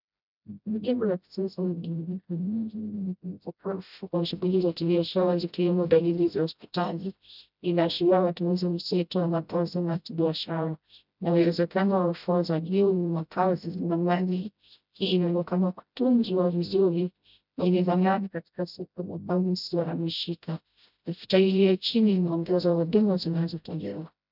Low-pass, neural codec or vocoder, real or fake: 5.4 kHz; codec, 16 kHz, 0.5 kbps, FreqCodec, smaller model; fake